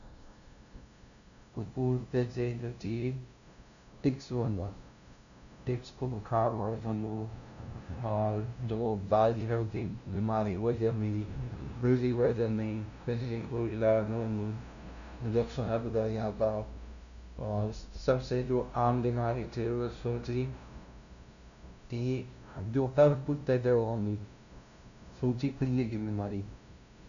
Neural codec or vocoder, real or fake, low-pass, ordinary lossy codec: codec, 16 kHz, 0.5 kbps, FunCodec, trained on LibriTTS, 25 frames a second; fake; 7.2 kHz; none